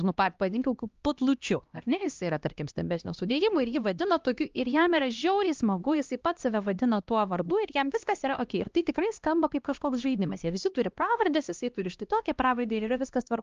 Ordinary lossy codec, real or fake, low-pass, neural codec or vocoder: Opus, 32 kbps; fake; 7.2 kHz; codec, 16 kHz, 1 kbps, X-Codec, HuBERT features, trained on LibriSpeech